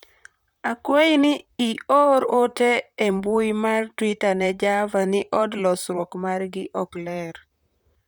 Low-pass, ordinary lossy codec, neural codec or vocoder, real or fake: none; none; vocoder, 44.1 kHz, 128 mel bands, Pupu-Vocoder; fake